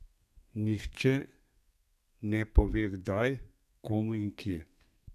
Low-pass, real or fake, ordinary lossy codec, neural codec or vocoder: 14.4 kHz; fake; none; codec, 32 kHz, 1.9 kbps, SNAC